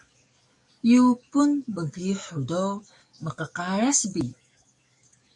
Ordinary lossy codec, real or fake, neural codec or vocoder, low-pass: MP3, 64 kbps; fake; codec, 44.1 kHz, 7.8 kbps, DAC; 10.8 kHz